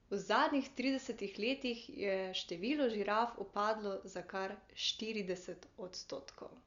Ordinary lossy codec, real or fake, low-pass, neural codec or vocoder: Opus, 64 kbps; real; 7.2 kHz; none